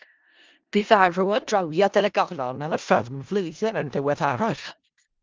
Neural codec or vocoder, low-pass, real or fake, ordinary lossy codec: codec, 16 kHz in and 24 kHz out, 0.4 kbps, LongCat-Audio-Codec, four codebook decoder; 7.2 kHz; fake; Opus, 32 kbps